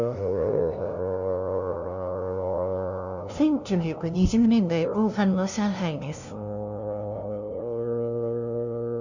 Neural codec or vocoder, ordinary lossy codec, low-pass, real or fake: codec, 16 kHz, 0.5 kbps, FunCodec, trained on LibriTTS, 25 frames a second; none; 7.2 kHz; fake